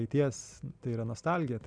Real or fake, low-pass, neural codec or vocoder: real; 9.9 kHz; none